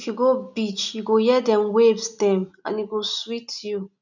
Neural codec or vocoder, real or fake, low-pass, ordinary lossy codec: none; real; 7.2 kHz; none